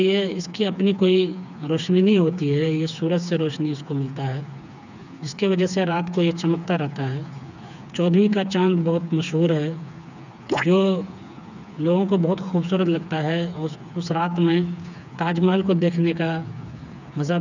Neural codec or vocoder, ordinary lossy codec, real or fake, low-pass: codec, 16 kHz, 4 kbps, FreqCodec, smaller model; none; fake; 7.2 kHz